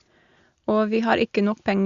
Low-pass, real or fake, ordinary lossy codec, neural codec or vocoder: 7.2 kHz; real; Opus, 64 kbps; none